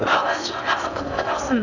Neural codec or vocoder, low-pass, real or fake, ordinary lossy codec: codec, 16 kHz in and 24 kHz out, 0.6 kbps, FocalCodec, streaming, 4096 codes; 7.2 kHz; fake; none